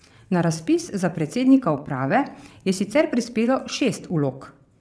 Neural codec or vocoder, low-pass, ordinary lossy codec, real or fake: vocoder, 22.05 kHz, 80 mel bands, WaveNeXt; none; none; fake